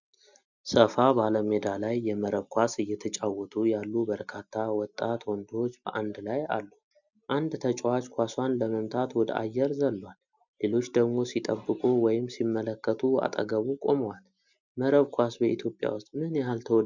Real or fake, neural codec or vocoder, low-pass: real; none; 7.2 kHz